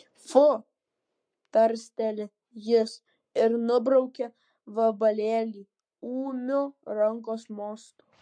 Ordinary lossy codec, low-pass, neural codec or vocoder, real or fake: MP3, 48 kbps; 9.9 kHz; codec, 44.1 kHz, 7.8 kbps, Pupu-Codec; fake